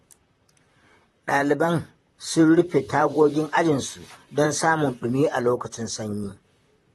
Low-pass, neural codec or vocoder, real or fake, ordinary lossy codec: 19.8 kHz; vocoder, 44.1 kHz, 128 mel bands, Pupu-Vocoder; fake; AAC, 32 kbps